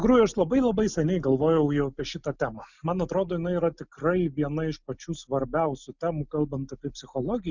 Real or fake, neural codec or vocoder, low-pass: real; none; 7.2 kHz